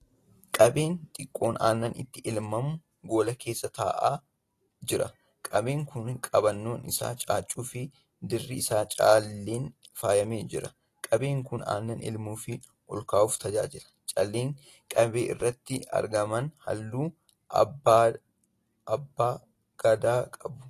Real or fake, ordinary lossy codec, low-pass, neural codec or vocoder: real; AAC, 48 kbps; 14.4 kHz; none